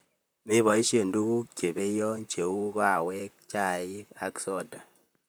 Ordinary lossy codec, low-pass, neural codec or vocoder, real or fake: none; none; vocoder, 44.1 kHz, 128 mel bands, Pupu-Vocoder; fake